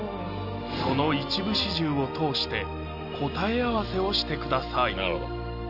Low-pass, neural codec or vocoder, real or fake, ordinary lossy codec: 5.4 kHz; none; real; none